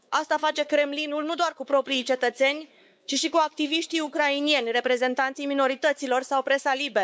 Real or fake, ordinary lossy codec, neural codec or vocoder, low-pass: fake; none; codec, 16 kHz, 4 kbps, X-Codec, WavLM features, trained on Multilingual LibriSpeech; none